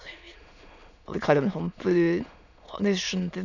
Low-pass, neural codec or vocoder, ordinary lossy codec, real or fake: 7.2 kHz; autoencoder, 22.05 kHz, a latent of 192 numbers a frame, VITS, trained on many speakers; none; fake